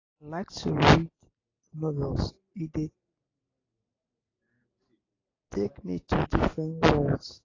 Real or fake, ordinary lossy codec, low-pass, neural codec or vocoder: real; AAC, 32 kbps; 7.2 kHz; none